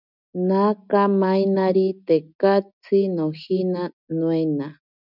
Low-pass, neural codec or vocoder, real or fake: 5.4 kHz; none; real